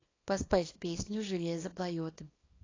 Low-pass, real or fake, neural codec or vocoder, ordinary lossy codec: 7.2 kHz; fake; codec, 24 kHz, 0.9 kbps, WavTokenizer, small release; AAC, 32 kbps